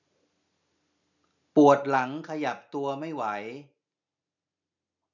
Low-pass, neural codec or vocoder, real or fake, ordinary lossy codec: 7.2 kHz; none; real; none